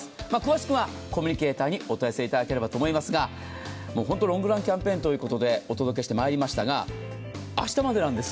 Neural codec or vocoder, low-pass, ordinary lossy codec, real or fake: none; none; none; real